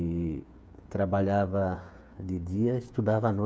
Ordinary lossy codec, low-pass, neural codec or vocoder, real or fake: none; none; codec, 16 kHz, 8 kbps, FreqCodec, smaller model; fake